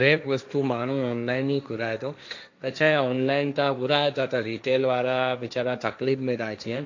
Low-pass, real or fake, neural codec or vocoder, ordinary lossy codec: 7.2 kHz; fake; codec, 16 kHz, 1.1 kbps, Voila-Tokenizer; AAC, 48 kbps